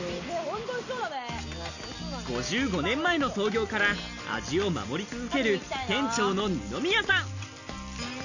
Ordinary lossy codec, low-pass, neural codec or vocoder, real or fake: none; 7.2 kHz; none; real